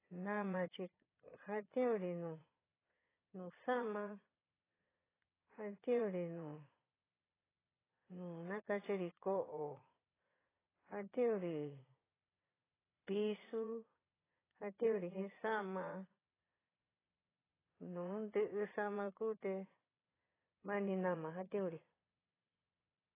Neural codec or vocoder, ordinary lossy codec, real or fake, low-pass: vocoder, 22.05 kHz, 80 mel bands, Vocos; AAC, 16 kbps; fake; 3.6 kHz